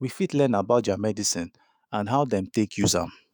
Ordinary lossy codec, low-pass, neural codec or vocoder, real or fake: none; none; autoencoder, 48 kHz, 128 numbers a frame, DAC-VAE, trained on Japanese speech; fake